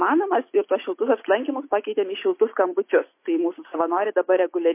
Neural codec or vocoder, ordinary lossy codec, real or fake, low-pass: none; MP3, 24 kbps; real; 3.6 kHz